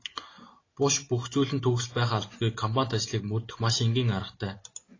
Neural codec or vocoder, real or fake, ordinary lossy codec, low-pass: none; real; AAC, 32 kbps; 7.2 kHz